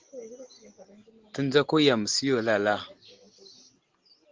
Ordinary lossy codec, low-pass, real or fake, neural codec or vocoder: Opus, 16 kbps; 7.2 kHz; real; none